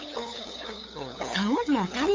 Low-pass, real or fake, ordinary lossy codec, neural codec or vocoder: 7.2 kHz; fake; MP3, 48 kbps; codec, 16 kHz, 8 kbps, FunCodec, trained on LibriTTS, 25 frames a second